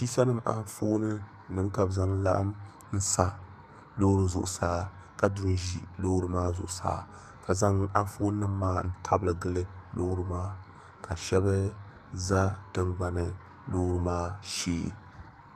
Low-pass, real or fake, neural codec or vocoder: 14.4 kHz; fake; codec, 44.1 kHz, 2.6 kbps, SNAC